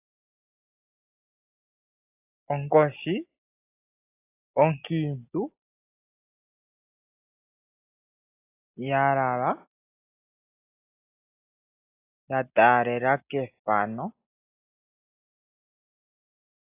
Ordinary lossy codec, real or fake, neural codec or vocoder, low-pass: AAC, 24 kbps; real; none; 3.6 kHz